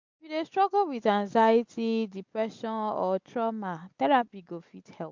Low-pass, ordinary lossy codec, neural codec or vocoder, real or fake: 7.2 kHz; MP3, 64 kbps; none; real